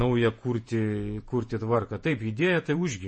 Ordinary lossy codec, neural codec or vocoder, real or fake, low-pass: MP3, 32 kbps; vocoder, 48 kHz, 128 mel bands, Vocos; fake; 9.9 kHz